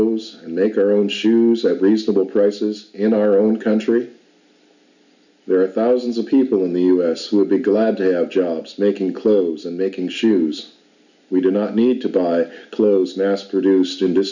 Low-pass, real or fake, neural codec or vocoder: 7.2 kHz; real; none